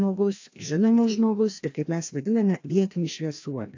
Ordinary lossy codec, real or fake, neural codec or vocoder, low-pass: AAC, 48 kbps; fake; codec, 16 kHz, 1 kbps, FreqCodec, larger model; 7.2 kHz